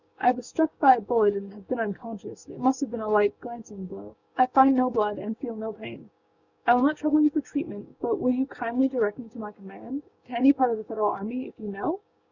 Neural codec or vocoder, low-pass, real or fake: codec, 16 kHz, 6 kbps, DAC; 7.2 kHz; fake